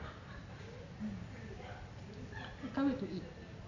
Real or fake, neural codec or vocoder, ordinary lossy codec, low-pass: real; none; AAC, 48 kbps; 7.2 kHz